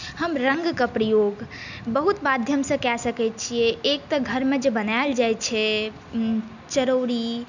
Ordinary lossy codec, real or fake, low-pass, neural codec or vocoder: none; real; 7.2 kHz; none